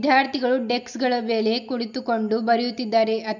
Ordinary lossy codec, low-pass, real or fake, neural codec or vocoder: none; 7.2 kHz; real; none